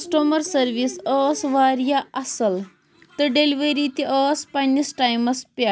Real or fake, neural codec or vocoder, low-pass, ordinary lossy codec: real; none; none; none